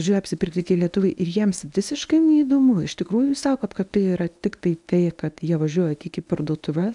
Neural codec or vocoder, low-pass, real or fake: codec, 24 kHz, 0.9 kbps, WavTokenizer, medium speech release version 1; 10.8 kHz; fake